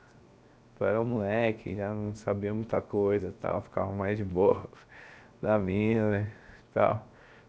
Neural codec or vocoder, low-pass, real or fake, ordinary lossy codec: codec, 16 kHz, 0.7 kbps, FocalCodec; none; fake; none